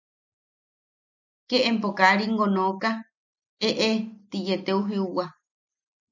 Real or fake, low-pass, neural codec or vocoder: real; 7.2 kHz; none